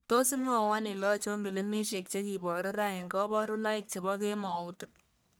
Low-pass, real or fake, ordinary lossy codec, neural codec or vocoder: none; fake; none; codec, 44.1 kHz, 1.7 kbps, Pupu-Codec